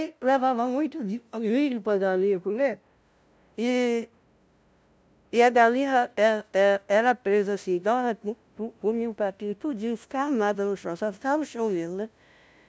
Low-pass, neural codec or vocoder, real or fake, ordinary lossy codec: none; codec, 16 kHz, 0.5 kbps, FunCodec, trained on LibriTTS, 25 frames a second; fake; none